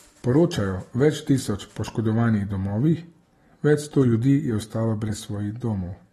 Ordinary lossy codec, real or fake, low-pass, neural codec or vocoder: AAC, 32 kbps; real; 19.8 kHz; none